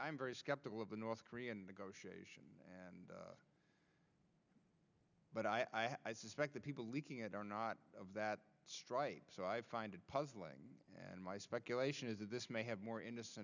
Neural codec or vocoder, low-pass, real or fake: none; 7.2 kHz; real